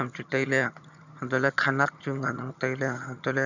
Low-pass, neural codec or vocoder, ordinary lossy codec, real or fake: 7.2 kHz; vocoder, 22.05 kHz, 80 mel bands, HiFi-GAN; none; fake